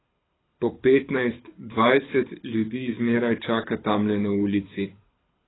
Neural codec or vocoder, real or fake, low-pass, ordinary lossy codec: codec, 24 kHz, 6 kbps, HILCodec; fake; 7.2 kHz; AAC, 16 kbps